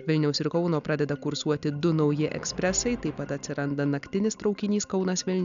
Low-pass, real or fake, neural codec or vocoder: 7.2 kHz; real; none